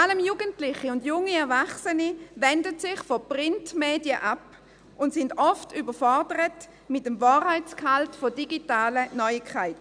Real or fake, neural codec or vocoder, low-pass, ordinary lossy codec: real; none; 9.9 kHz; none